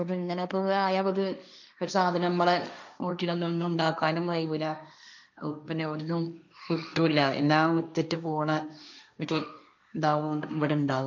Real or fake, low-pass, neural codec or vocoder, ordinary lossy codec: fake; 7.2 kHz; codec, 16 kHz, 1.1 kbps, Voila-Tokenizer; none